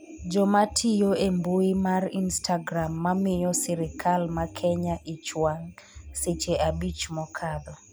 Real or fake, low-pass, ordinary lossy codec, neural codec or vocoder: real; none; none; none